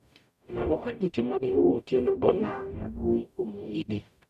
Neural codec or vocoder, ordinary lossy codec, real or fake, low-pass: codec, 44.1 kHz, 0.9 kbps, DAC; none; fake; 14.4 kHz